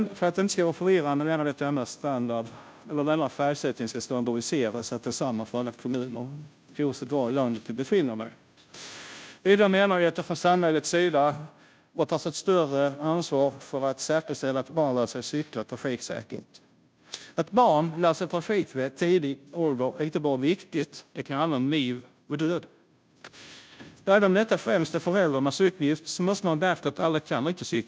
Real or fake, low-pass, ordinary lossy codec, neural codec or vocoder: fake; none; none; codec, 16 kHz, 0.5 kbps, FunCodec, trained on Chinese and English, 25 frames a second